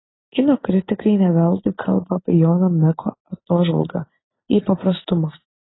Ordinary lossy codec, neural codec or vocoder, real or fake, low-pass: AAC, 16 kbps; none; real; 7.2 kHz